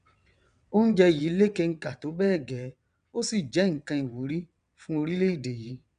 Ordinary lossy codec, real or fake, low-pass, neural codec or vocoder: none; fake; 9.9 kHz; vocoder, 22.05 kHz, 80 mel bands, WaveNeXt